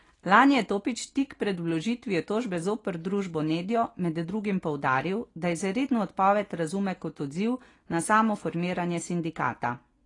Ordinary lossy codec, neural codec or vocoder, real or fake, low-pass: AAC, 32 kbps; none; real; 10.8 kHz